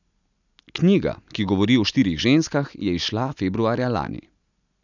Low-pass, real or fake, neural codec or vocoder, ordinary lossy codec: 7.2 kHz; real; none; none